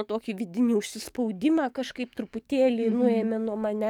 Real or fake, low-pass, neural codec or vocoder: fake; 19.8 kHz; codec, 44.1 kHz, 7.8 kbps, DAC